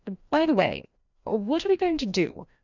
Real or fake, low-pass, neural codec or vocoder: fake; 7.2 kHz; codec, 16 kHz, 1 kbps, FreqCodec, larger model